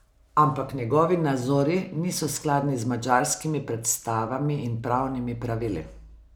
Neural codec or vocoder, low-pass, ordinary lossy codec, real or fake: none; none; none; real